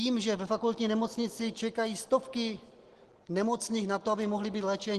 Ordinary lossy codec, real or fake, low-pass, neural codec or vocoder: Opus, 16 kbps; real; 10.8 kHz; none